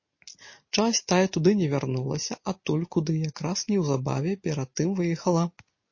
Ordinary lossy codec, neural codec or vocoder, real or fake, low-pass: MP3, 32 kbps; none; real; 7.2 kHz